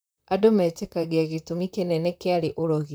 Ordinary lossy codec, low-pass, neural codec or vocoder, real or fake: none; none; vocoder, 44.1 kHz, 128 mel bands, Pupu-Vocoder; fake